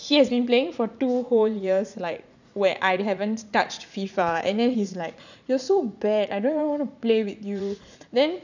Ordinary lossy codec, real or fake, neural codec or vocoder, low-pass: none; fake; vocoder, 44.1 kHz, 80 mel bands, Vocos; 7.2 kHz